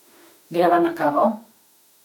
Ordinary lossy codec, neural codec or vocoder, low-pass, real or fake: none; autoencoder, 48 kHz, 32 numbers a frame, DAC-VAE, trained on Japanese speech; 19.8 kHz; fake